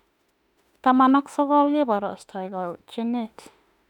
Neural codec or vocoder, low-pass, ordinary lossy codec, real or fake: autoencoder, 48 kHz, 32 numbers a frame, DAC-VAE, trained on Japanese speech; 19.8 kHz; none; fake